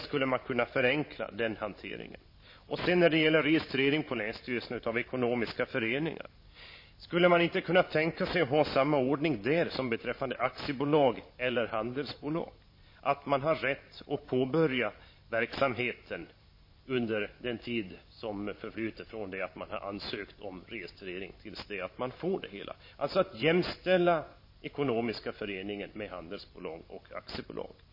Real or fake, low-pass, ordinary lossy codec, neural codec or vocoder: fake; 5.4 kHz; MP3, 24 kbps; vocoder, 44.1 kHz, 128 mel bands every 512 samples, BigVGAN v2